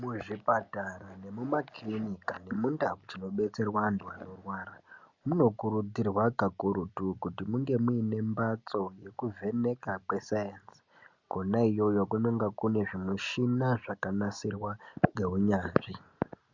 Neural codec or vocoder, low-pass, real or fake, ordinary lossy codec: none; 7.2 kHz; real; Opus, 64 kbps